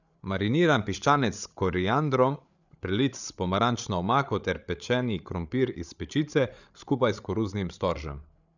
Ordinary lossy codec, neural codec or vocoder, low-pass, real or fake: none; codec, 16 kHz, 8 kbps, FreqCodec, larger model; 7.2 kHz; fake